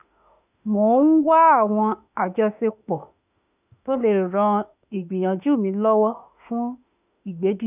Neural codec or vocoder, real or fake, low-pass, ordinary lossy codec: autoencoder, 48 kHz, 32 numbers a frame, DAC-VAE, trained on Japanese speech; fake; 3.6 kHz; none